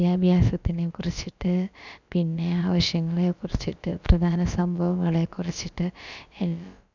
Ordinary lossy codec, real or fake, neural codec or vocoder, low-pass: none; fake; codec, 16 kHz, about 1 kbps, DyCAST, with the encoder's durations; 7.2 kHz